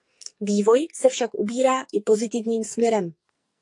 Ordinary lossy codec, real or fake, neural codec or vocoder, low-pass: AAC, 64 kbps; fake; codec, 44.1 kHz, 2.6 kbps, SNAC; 10.8 kHz